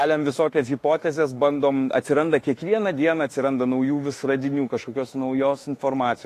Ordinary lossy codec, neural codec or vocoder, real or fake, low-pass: AAC, 48 kbps; autoencoder, 48 kHz, 32 numbers a frame, DAC-VAE, trained on Japanese speech; fake; 14.4 kHz